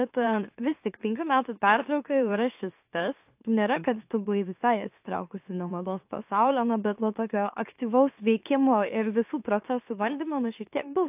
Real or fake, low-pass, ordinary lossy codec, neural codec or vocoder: fake; 3.6 kHz; AAC, 32 kbps; autoencoder, 44.1 kHz, a latent of 192 numbers a frame, MeloTTS